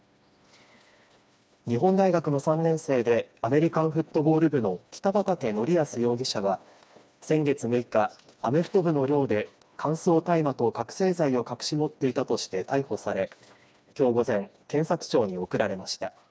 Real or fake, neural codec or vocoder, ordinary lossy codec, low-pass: fake; codec, 16 kHz, 2 kbps, FreqCodec, smaller model; none; none